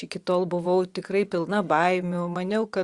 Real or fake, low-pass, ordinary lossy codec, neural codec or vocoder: fake; 10.8 kHz; Opus, 64 kbps; vocoder, 24 kHz, 100 mel bands, Vocos